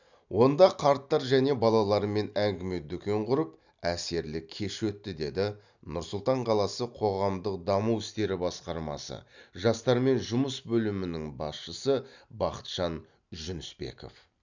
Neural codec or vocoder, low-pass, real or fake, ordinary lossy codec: none; 7.2 kHz; real; none